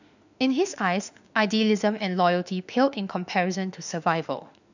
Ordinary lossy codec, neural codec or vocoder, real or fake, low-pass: none; autoencoder, 48 kHz, 32 numbers a frame, DAC-VAE, trained on Japanese speech; fake; 7.2 kHz